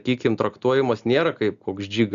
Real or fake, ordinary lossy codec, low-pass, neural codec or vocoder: real; Opus, 64 kbps; 7.2 kHz; none